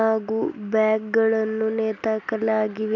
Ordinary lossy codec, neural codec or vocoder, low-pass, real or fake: none; none; 7.2 kHz; real